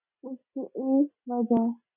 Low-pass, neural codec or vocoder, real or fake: 3.6 kHz; none; real